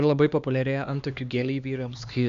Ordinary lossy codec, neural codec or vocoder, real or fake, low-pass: Opus, 64 kbps; codec, 16 kHz, 2 kbps, X-Codec, HuBERT features, trained on LibriSpeech; fake; 7.2 kHz